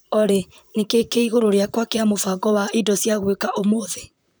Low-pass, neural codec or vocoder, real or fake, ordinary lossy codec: none; vocoder, 44.1 kHz, 128 mel bands, Pupu-Vocoder; fake; none